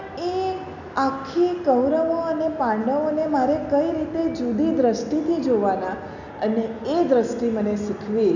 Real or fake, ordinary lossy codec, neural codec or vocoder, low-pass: real; none; none; 7.2 kHz